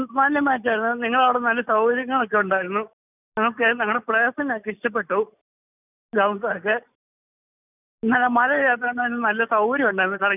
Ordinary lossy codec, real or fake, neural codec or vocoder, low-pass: none; real; none; 3.6 kHz